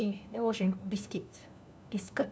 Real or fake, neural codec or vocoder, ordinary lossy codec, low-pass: fake; codec, 16 kHz, 1 kbps, FunCodec, trained on LibriTTS, 50 frames a second; none; none